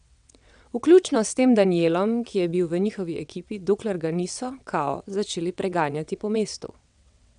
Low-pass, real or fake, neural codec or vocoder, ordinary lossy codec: 9.9 kHz; fake; vocoder, 22.05 kHz, 80 mel bands, WaveNeXt; none